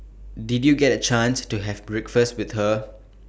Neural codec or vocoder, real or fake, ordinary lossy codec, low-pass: none; real; none; none